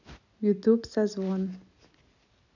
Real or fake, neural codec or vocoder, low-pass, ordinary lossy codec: real; none; 7.2 kHz; none